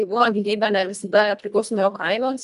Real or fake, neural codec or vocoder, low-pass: fake; codec, 24 kHz, 1.5 kbps, HILCodec; 10.8 kHz